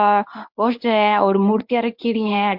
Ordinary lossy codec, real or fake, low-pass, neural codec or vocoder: MP3, 48 kbps; fake; 5.4 kHz; codec, 24 kHz, 0.9 kbps, WavTokenizer, medium speech release version 1